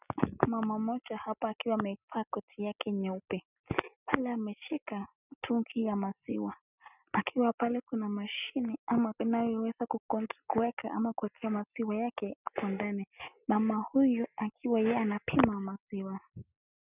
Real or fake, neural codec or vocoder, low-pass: real; none; 3.6 kHz